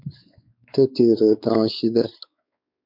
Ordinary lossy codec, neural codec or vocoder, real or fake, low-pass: MP3, 48 kbps; codec, 16 kHz, 4 kbps, X-Codec, WavLM features, trained on Multilingual LibriSpeech; fake; 5.4 kHz